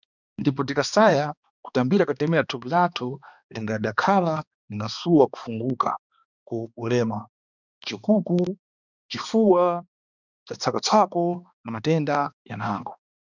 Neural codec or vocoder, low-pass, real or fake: codec, 16 kHz, 2 kbps, X-Codec, HuBERT features, trained on balanced general audio; 7.2 kHz; fake